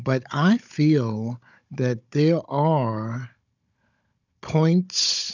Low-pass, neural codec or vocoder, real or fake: 7.2 kHz; codec, 16 kHz, 16 kbps, FunCodec, trained on Chinese and English, 50 frames a second; fake